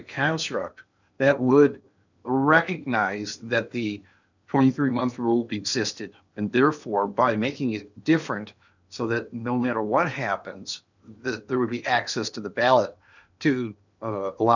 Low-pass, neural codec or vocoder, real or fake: 7.2 kHz; codec, 16 kHz in and 24 kHz out, 0.8 kbps, FocalCodec, streaming, 65536 codes; fake